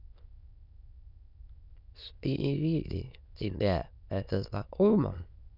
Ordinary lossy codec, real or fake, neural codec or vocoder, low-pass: none; fake; autoencoder, 22.05 kHz, a latent of 192 numbers a frame, VITS, trained on many speakers; 5.4 kHz